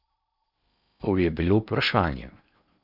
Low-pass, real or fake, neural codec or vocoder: 5.4 kHz; fake; codec, 16 kHz in and 24 kHz out, 0.8 kbps, FocalCodec, streaming, 65536 codes